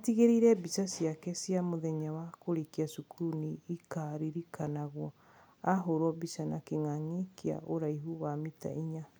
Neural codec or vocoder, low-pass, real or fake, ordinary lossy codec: none; none; real; none